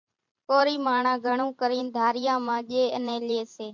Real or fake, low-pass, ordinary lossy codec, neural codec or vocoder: fake; 7.2 kHz; MP3, 48 kbps; vocoder, 44.1 kHz, 128 mel bands every 512 samples, BigVGAN v2